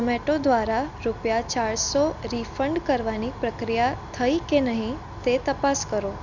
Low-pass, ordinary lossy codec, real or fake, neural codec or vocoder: 7.2 kHz; none; real; none